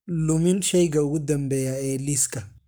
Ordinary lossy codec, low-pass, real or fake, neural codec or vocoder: none; none; fake; codec, 44.1 kHz, 7.8 kbps, Pupu-Codec